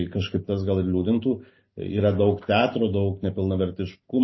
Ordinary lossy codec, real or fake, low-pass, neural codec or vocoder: MP3, 24 kbps; real; 7.2 kHz; none